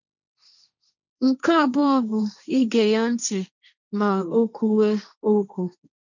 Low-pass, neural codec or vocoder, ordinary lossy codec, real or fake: 7.2 kHz; codec, 16 kHz, 1.1 kbps, Voila-Tokenizer; none; fake